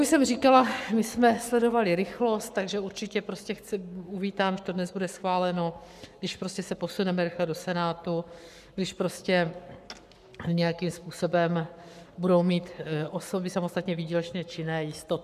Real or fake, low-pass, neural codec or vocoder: fake; 14.4 kHz; codec, 44.1 kHz, 7.8 kbps, Pupu-Codec